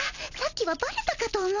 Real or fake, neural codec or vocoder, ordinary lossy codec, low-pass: real; none; none; 7.2 kHz